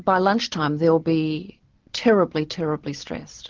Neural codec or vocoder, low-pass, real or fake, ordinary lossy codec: none; 7.2 kHz; real; Opus, 16 kbps